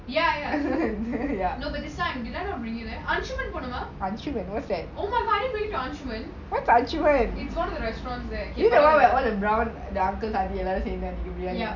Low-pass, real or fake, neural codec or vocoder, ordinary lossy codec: 7.2 kHz; real; none; none